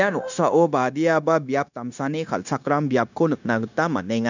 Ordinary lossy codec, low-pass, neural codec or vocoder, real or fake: none; 7.2 kHz; codec, 16 kHz, 0.9 kbps, LongCat-Audio-Codec; fake